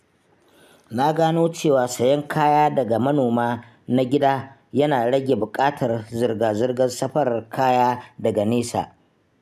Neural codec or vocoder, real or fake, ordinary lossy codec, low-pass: none; real; none; 14.4 kHz